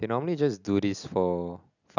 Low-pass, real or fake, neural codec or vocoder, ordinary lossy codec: 7.2 kHz; real; none; none